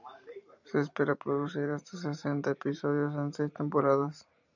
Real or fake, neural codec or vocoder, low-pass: fake; vocoder, 44.1 kHz, 128 mel bands every 512 samples, BigVGAN v2; 7.2 kHz